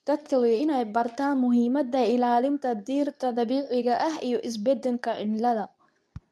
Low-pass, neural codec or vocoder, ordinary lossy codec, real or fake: none; codec, 24 kHz, 0.9 kbps, WavTokenizer, medium speech release version 2; none; fake